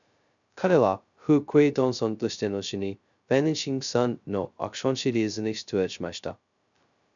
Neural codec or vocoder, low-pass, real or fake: codec, 16 kHz, 0.2 kbps, FocalCodec; 7.2 kHz; fake